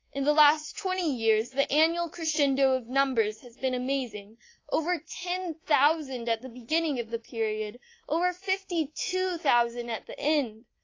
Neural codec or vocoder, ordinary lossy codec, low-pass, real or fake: codec, 24 kHz, 3.1 kbps, DualCodec; AAC, 32 kbps; 7.2 kHz; fake